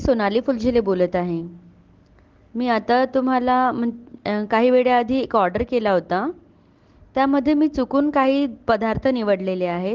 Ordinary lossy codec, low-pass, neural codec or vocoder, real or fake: Opus, 16 kbps; 7.2 kHz; none; real